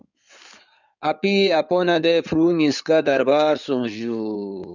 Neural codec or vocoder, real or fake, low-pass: codec, 16 kHz in and 24 kHz out, 2.2 kbps, FireRedTTS-2 codec; fake; 7.2 kHz